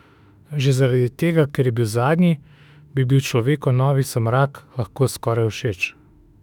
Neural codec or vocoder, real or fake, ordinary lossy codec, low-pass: autoencoder, 48 kHz, 32 numbers a frame, DAC-VAE, trained on Japanese speech; fake; none; 19.8 kHz